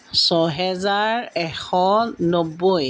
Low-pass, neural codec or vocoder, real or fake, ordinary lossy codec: none; none; real; none